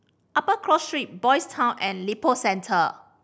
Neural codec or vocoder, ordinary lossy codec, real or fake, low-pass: none; none; real; none